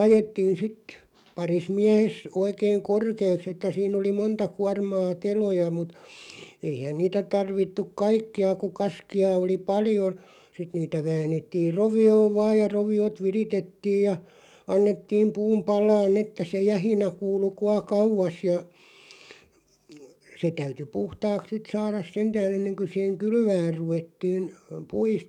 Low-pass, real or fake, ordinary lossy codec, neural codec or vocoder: 19.8 kHz; fake; none; codec, 44.1 kHz, 7.8 kbps, DAC